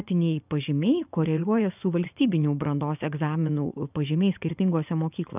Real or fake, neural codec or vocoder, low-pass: fake; vocoder, 24 kHz, 100 mel bands, Vocos; 3.6 kHz